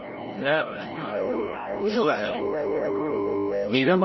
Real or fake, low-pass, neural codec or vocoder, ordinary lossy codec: fake; 7.2 kHz; codec, 16 kHz, 0.5 kbps, FreqCodec, larger model; MP3, 24 kbps